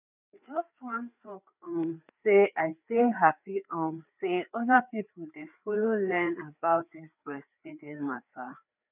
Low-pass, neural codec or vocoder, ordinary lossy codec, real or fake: 3.6 kHz; codec, 16 kHz, 4 kbps, FreqCodec, larger model; none; fake